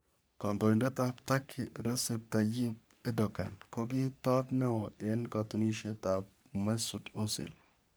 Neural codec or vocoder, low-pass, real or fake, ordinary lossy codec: codec, 44.1 kHz, 3.4 kbps, Pupu-Codec; none; fake; none